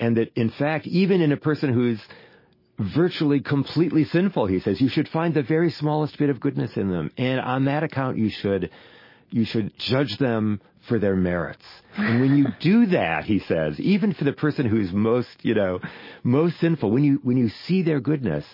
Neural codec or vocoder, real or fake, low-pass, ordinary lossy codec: none; real; 5.4 kHz; MP3, 24 kbps